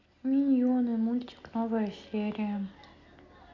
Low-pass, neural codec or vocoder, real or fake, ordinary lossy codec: 7.2 kHz; none; real; none